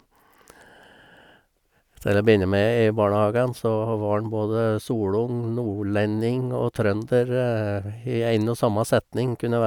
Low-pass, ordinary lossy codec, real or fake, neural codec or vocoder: 19.8 kHz; none; real; none